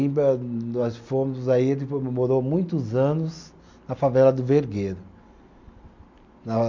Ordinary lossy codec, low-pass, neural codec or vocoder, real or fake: MP3, 64 kbps; 7.2 kHz; none; real